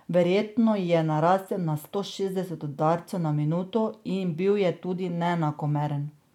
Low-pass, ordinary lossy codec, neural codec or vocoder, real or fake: 19.8 kHz; none; none; real